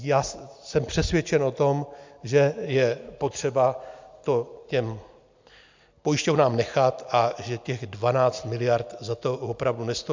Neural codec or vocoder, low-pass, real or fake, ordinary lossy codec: none; 7.2 kHz; real; MP3, 64 kbps